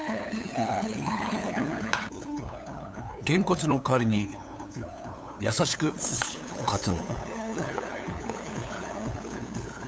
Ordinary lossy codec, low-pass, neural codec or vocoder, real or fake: none; none; codec, 16 kHz, 8 kbps, FunCodec, trained on LibriTTS, 25 frames a second; fake